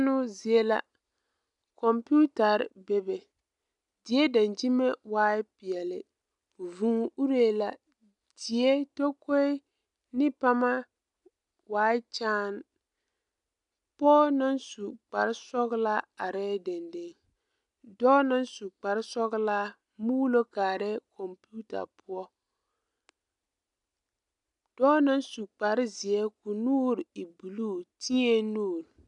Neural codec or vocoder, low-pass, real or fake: none; 10.8 kHz; real